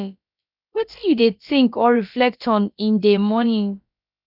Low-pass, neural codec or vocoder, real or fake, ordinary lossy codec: 5.4 kHz; codec, 16 kHz, about 1 kbps, DyCAST, with the encoder's durations; fake; none